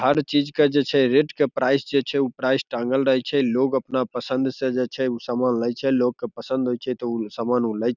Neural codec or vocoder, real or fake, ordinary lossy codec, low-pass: none; real; none; 7.2 kHz